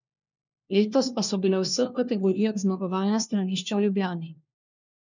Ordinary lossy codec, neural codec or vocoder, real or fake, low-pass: none; codec, 16 kHz, 1 kbps, FunCodec, trained on LibriTTS, 50 frames a second; fake; 7.2 kHz